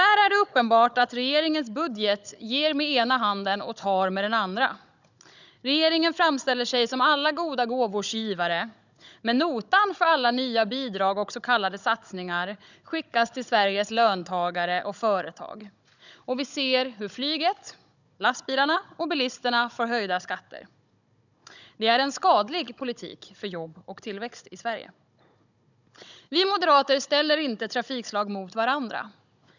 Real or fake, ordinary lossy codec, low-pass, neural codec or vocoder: fake; none; 7.2 kHz; codec, 16 kHz, 16 kbps, FunCodec, trained on Chinese and English, 50 frames a second